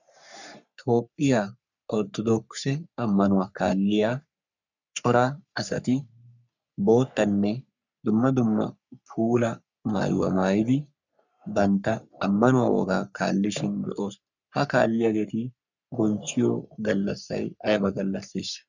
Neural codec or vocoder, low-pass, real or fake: codec, 44.1 kHz, 3.4 kbps, Pupu-Codec; 7.2 kHz; fake